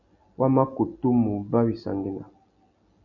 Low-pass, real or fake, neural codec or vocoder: 7.2 kHz; real; none